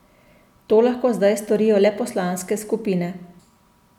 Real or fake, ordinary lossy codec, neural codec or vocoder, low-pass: real; none; none; 19.8 kHz